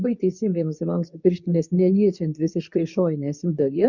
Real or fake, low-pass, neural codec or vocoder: fake; 7.2 kHz; codec, 24 kHz, 0.9 kbps, WavTokenizer, medium speech release version 2